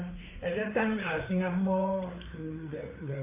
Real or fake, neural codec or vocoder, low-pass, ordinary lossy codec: fake; codec, 16 kHz, 4 kbps, FreqCodec, larger model; 3.6 kHz; MP3, 24 kbps